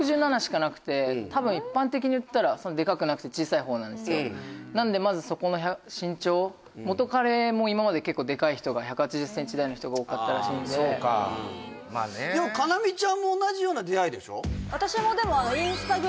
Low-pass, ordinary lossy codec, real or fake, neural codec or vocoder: none; none; real; none